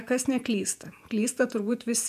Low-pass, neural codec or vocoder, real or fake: 14.4 kHz; vocoder, 48 kHz, 128 mel bands, Vocos; fake